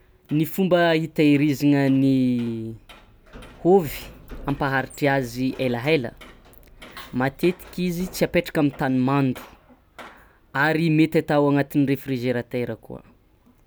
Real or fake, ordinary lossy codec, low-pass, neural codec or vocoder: real; none; none; none